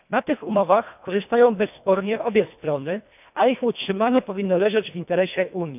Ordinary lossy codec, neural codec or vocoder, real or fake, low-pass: none; codec, 24 kHz, 1.5 kbps, HILCodec; fake; 3.6 kHz